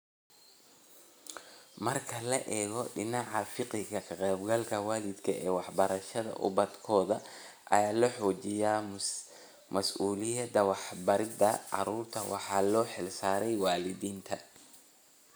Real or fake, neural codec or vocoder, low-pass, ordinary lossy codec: real; none; none; none